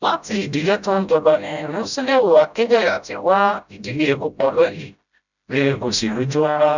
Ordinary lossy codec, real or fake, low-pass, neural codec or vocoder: none; fake; 7.2 kHz; codec, 16 kHz, 0.5 kbps, FreqCodec, smaller model